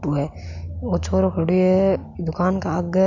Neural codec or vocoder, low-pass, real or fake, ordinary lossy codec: none; 7.2 kHz; real; none